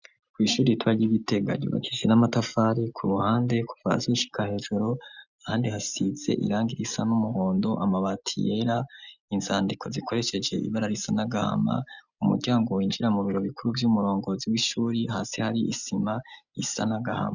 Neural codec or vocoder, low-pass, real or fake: none; 7.2 kHz; real